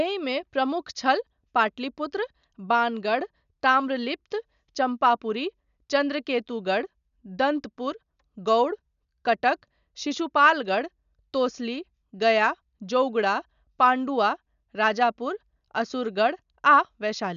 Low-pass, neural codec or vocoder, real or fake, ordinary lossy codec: 7.2 kHz; none; real; none